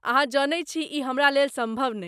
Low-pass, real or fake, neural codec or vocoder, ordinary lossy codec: 14.4 kHz; real; none; none